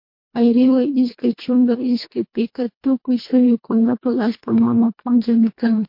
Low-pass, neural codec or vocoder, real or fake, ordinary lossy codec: 5.4 kHz; codec, 24 kHz, 1.5 kbps, HILCodec; fake; MP3, 32 kbps